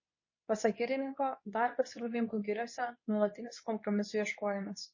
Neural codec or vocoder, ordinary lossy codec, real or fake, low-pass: codec, 24 kHz, 0.9 kbps, WavTokenizer, medium speech release version 2; MP3, 32 kbps; fake; 7.2 kHz